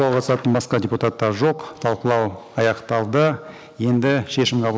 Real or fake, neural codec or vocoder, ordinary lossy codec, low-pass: real; none; none; none